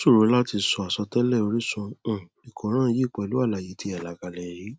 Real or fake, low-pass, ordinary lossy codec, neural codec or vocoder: real; none; none; none